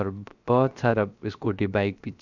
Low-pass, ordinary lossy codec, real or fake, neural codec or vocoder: 7.2 kHz; none; fake; codec, 16 kHz, 0.7 kbps, FocalCodec